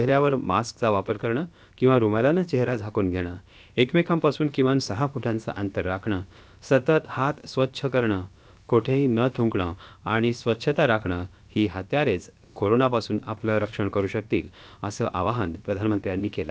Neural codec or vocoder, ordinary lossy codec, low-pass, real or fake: codec, 16 kHz, about 1 kbps, DyCAST, with the encoder's durations; none; none; fake